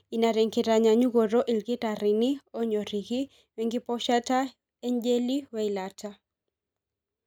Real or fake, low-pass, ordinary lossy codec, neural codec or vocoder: real; 19.8 kHz; none; none